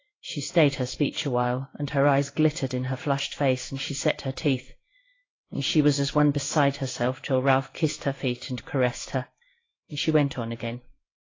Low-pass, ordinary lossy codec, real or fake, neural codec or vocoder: 7.2 kHz; AAC, 32 kbps; fake; codec, 16 kHz in and 24 kHz out, 1 kbps, XY-Tokenizer